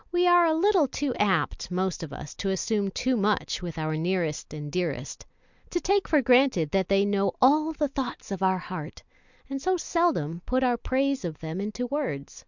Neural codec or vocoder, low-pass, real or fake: none; 7.2 kHz; real